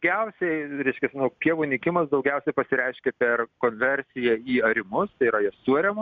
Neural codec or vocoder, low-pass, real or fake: none; 7.2 kHz; real